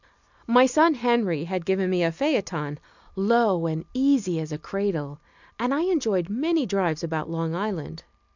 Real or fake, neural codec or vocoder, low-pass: real; none; 7.2 kHz